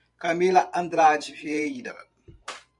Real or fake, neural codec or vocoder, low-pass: fake; vocoder, 44.1 kHz, 128 mel bands every 512 samples, BigVGAN v2; 10.8 kHz